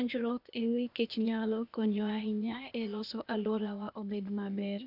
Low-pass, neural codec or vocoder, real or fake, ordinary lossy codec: 5.4 kHz; codec, 16 kHz, 0.8 kbps, ZipCodec; fake; none